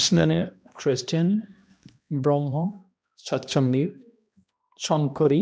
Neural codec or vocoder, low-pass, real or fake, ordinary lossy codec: codec, 16 kHz, 1 kbps, X-Codec, HuBERT features, trained on balanced general audio; none; fake; none